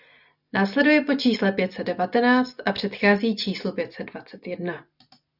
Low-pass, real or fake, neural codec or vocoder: 5.4 kHz; real; none